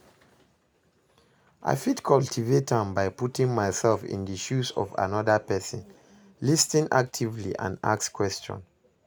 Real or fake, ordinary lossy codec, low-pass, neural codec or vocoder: real; none; none; none